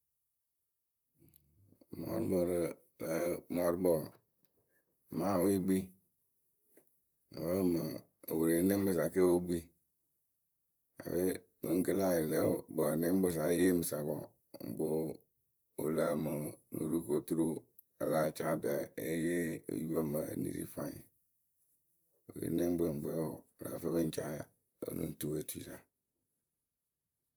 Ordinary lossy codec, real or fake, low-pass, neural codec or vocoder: none; fake; none; vocoder, 44.1 kHz, 128 mel bands, Pupu-Vocoder